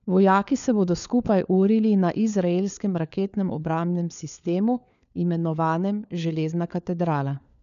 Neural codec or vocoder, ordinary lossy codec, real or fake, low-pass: codec, 16 kHz, 4 kbps, FunCodec, trained on LibriTTS, 50 frames a second; none; fake; 7.2 kHz